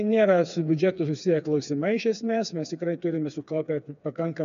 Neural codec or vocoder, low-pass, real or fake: codec, 16 kHz, 4 kbps, FreqCodec, smaller model; 7.2 kHz; fake